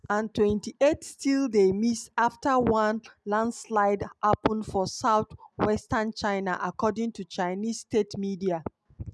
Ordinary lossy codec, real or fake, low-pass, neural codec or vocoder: none; real; none; none